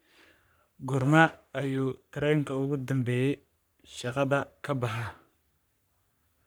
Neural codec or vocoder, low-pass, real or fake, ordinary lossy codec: codec, 44.1 kHz, 3.4 kbps, Pupu-Codec; none; fake; none